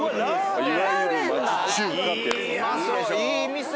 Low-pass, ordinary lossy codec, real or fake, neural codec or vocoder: none; none; real; none